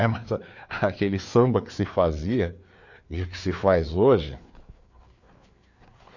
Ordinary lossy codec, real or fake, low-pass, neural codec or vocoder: AAC, 48 kbps; fake; 7.2 kHz; codec, 16 kHz, 4 kbps, FreqCodec, larger model